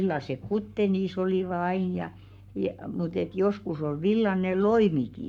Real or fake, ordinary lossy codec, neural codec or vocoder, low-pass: fake; none; codec, 44.1 kHz, 7.8 kbps, Pupu-Codec; 19.8 kHz